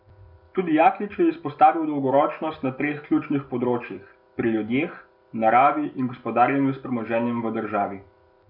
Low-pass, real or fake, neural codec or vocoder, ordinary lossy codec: 5.4 kHz; real; none; none